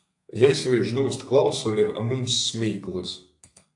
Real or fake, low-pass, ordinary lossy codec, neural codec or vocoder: fake; 10.8 kHz; AAC, 64 kbps; codec, 32 kHz, 1.9 kbps, SNAC